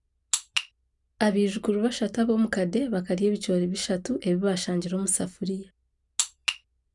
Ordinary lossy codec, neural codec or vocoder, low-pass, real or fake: none; none; 10.8 kHz; real